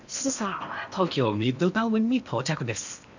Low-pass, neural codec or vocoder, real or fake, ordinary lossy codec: 7.2 kHz; codec, 16 kHz in and 24 kHz out, 0.8 kbps, FocalCodec, streaming, 65536 codes; fake; none